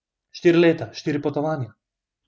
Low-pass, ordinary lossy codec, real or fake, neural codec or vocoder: none; none; real; none